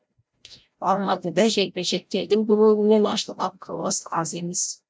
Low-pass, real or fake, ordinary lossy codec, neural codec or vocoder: none; fake; none; codec, 16 kHz, 0.5 kbps, FreqCodec, larger model